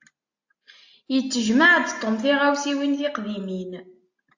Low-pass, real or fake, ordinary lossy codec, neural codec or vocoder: 7.2 kHz; real; AAC, 48 kbps; none